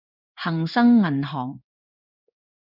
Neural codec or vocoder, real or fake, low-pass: codec, 16 kHz in and 24 kHz out, 1 kbps, XY-Tokenizer; fake; 5.4 kHz